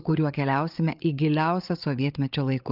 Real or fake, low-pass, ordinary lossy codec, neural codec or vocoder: fake; 5.4 kHz; Opus, 24 kbps; codec, 16 kHz, 8 kbps, FreqCodec, larger model